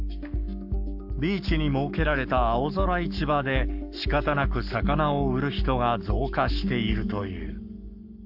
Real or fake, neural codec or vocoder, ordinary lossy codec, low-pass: fake; codec, 44.1 kHz, 7.8 kbps, Pupu-Codec; AAC, 48 kbps; 5.4 kHz